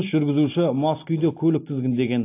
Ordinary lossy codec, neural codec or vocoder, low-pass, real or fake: AAC, 24 kbps; none; 3.6 kHz; real